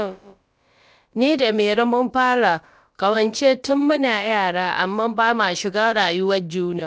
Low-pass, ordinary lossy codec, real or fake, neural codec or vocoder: none; none; fake; codec, 16 kHz, about 1 kbps, DyCAST, with the encoder's durations